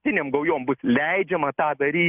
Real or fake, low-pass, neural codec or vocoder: real; 3.6 kHz; none